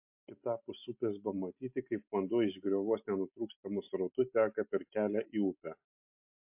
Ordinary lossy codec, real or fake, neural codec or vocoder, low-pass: MP3, 32 kbps; real; none; 3.6 kHz